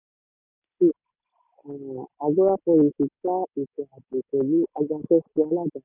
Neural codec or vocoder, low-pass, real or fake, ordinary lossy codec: none; 3.6 kHz; real; none